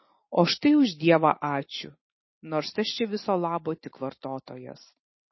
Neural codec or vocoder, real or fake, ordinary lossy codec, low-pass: none; real; MP3, 24 kbps; 7.2 kHz